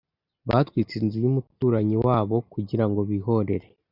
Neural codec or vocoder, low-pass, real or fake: none; 5.4 kHz; real